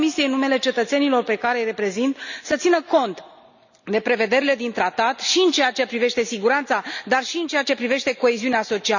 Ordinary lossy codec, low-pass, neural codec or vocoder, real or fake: none; 7.2 kHz; none; real